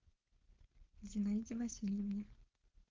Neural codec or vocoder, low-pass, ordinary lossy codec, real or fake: codec, 16 kHz, 4.8 kbps, FACodec; 7.2 kHz; Opus, 16 kbps; fake